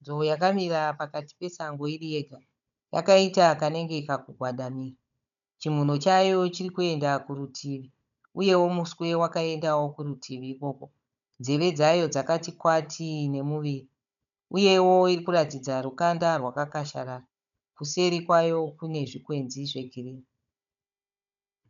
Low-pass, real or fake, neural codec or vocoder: 7.2 kHz; fake; codec, 16 kHz, 16 kbps, FunCodec, trained on Chinese and English, 50 frames a second